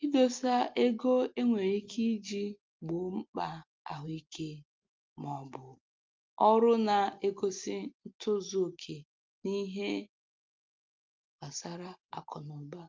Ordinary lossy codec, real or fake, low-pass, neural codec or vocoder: Opus, 24 kbps; real; 7.2 kHz; none